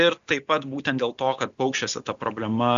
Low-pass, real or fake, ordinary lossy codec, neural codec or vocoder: 7.2 kHz; real; AAC, 96 kbps; none